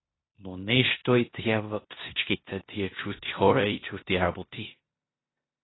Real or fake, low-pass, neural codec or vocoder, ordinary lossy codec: fake; 7.2 kHz; codec, 16 kHz in and 24 kHz out, 0.9 kbps, LongCat-Audio-Codec, four codebook decoder; AAC, 16 kbps